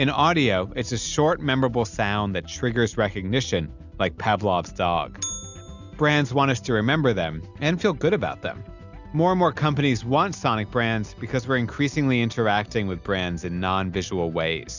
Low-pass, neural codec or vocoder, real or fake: 7.2 kHz; none; real